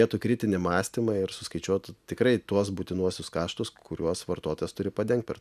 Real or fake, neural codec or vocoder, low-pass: real; none; 14.4 kHz